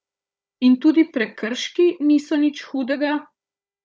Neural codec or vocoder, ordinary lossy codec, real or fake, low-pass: codec, 16 kHz, 16 kbps, FunCodec, trained on Chinese and English, 50 frames a second; none; fake; none